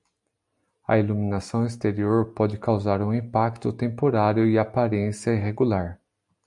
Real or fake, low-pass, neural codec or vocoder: real; 10.8 kHz; none